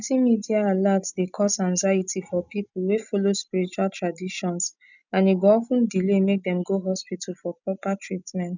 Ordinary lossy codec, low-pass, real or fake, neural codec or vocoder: none; 7.2 kHz; real; none